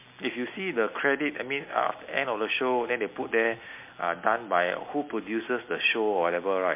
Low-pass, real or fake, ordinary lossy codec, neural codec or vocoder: 3.6 kHz; real; MP3, 24 kbps; none